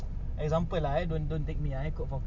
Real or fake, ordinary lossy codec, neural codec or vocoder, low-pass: real; none; none; 7.2 kHz